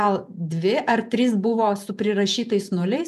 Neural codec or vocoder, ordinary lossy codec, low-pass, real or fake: vocoder, 48 kHz, 128 mel bands, Vocos; MP3, 96 kbps; 14.4 kHz; fake